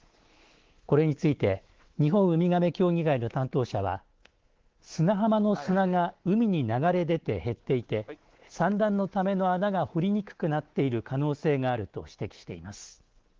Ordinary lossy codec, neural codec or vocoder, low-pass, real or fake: Opus, 16 kbps; codec, 24 kHz, 3.1 kbps, DualCodec; 7.2 kHz; fake